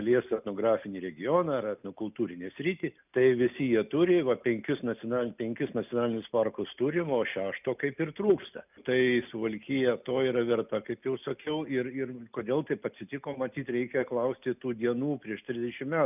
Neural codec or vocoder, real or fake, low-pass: none; real; 3.6 kHz